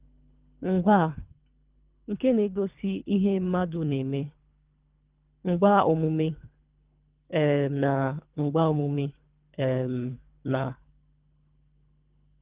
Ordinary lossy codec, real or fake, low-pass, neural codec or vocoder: Opus, 32 kbps; fake; 3.6 kHz; codec, 24 kHz, 3 kbps, HILCodec